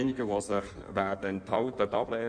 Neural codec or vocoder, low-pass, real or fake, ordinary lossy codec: codec, 16 kHz in and 24 kHz out, 1.1 kbps, FireRedTTS-2 codec; 9.9 kHz; fake; none